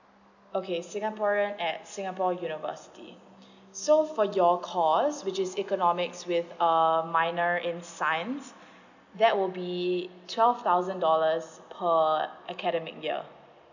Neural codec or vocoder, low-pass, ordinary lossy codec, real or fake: none; 7.2 kHz; none; real